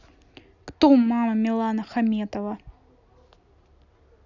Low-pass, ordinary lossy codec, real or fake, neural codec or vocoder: 7.2 kHz; none; real; none